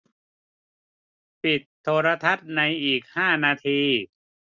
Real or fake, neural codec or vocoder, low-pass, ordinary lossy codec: real; none; 7.2 kHz; none